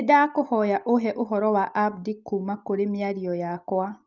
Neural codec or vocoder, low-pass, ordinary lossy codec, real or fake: none; 7.2 kHz; Opus, 32 kbps; real